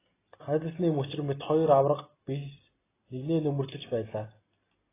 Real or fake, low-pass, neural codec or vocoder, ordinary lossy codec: real; 3.6 kHz; none; AAC, 16 kbps